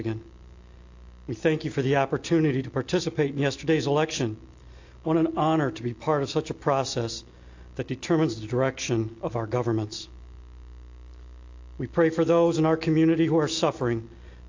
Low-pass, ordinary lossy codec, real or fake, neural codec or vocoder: 7.2 kHz; AAC, 48 kbps; real; none